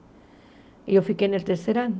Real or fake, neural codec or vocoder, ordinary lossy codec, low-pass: real; none; none; none